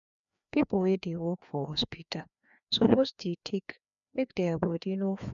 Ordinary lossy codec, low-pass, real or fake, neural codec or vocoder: none; 7.2 kHz; fake; codec, 16 kHz, 2 kbps, FreqCodec, larger model